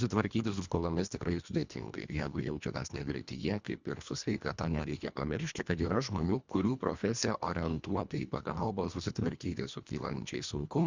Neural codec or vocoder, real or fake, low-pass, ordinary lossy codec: codec, 24 kHz, 1.5 kbps, HILCodec; fake; 7.2 kHz; Opus, 64 kbps